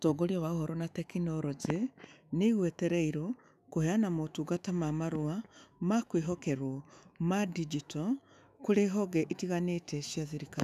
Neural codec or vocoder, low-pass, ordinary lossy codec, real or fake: autoencoder, 48 kHz, 128 numbers a frame, DAC-VAE, trained on Japanese speech; 14.4 kHz; none; fake